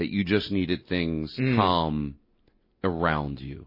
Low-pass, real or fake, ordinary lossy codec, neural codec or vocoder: 5.4 kHz; real; MP3, 24 kbps; none